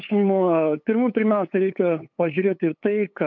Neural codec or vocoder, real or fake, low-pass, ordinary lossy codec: codec, 16 kHz, 8 kbps, FunCodec, trained on LibriTTS, 25 frames a second; fake; 7.2 kHz; MP3, 48 kbps